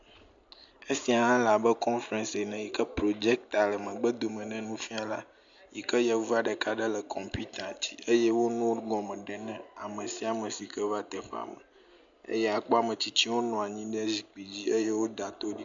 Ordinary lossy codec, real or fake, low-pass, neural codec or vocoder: MP3, 64 kbps; real; 7.2 kHz; none